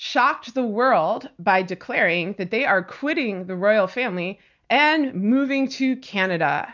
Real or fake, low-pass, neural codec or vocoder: real; 7.2 kHz; none